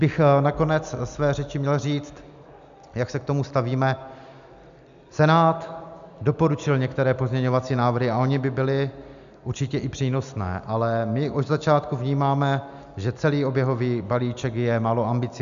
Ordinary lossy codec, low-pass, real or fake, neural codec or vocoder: AAC, 96 kbps; 7.2 kHz; real; none